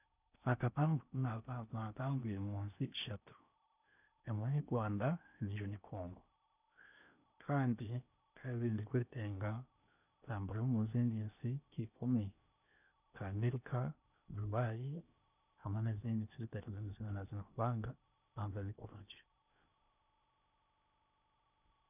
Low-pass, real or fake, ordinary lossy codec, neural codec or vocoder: 3.6 kHz; fake; none; codec, 16 kHz in and 24 kHz out, 0.8 kbps, FocalCodec, streaming, 65536 codes